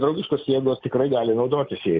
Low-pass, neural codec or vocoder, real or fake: 7.2 kHz; none; real